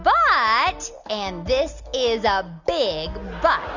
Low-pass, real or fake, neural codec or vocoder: 7.2 kHz; real; none